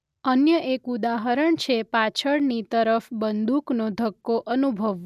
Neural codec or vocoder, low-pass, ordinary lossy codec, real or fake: none; 14.4 kHz; none; real